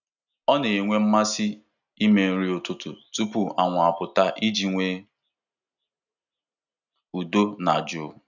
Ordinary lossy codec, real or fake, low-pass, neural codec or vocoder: none; real; 7.2 kHz; none